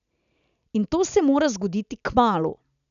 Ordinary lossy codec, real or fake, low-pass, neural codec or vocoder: none; real; 7.2 kHz; none